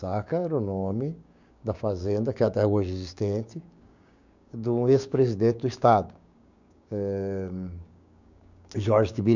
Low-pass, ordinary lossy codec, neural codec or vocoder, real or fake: 7.2 kHz; none; codec, 16 kHz, 6 kbps, DAC; fake